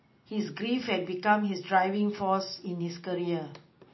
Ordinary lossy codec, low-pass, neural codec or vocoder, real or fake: MP3, 24 kbps; 7.2 kHz; none; real